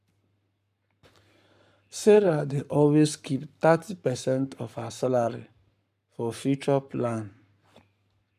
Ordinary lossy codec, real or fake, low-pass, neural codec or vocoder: none; fake; 14.4 kHz; codec, 44.1 kHz, 7.8 kbps, Pupu-Codec